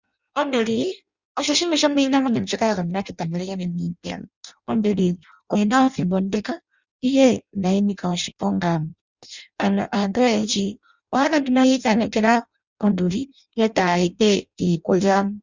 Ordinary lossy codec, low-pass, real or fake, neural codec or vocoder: Opus, 64 kbps; 7.2 kHz; fake; codec, 16 kHz in and 24 kHz out, 0.6 kbps, FireRedTTS-2 codec